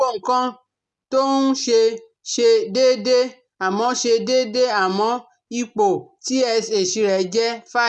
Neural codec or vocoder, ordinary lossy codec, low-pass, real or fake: none; none; 10.8 kHz; real